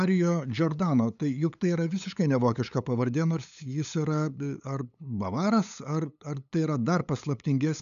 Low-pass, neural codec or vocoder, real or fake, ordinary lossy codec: 7.2 kHz; codec, 16 kHz, 8 kbps, FunCodec, trained on LibriTTS, 25 frames a second; fake; MP3, 96 kbps